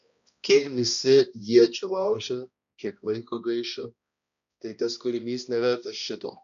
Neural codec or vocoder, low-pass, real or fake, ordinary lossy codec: codec, 16 kHz, 1 kbps, X-Codec, HuBERT features, trained on balanced general audio; 7.2 kHz; fake; AAC, 96 kbps